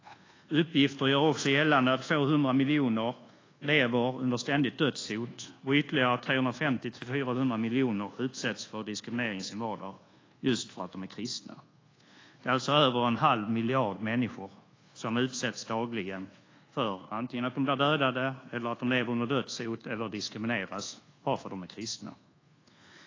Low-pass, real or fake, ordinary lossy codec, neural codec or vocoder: 7.2 kHz; fake; AAC, 32 kbps; codec, 24 kHz, 1.2 kbps, DualCodec